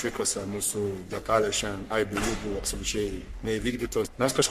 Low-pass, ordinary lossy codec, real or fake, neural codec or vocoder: 14.4 kHz; MP3, 64 kbps; fake; codec, 44.1 kHz, 3.4 kbps, Pupu-Codec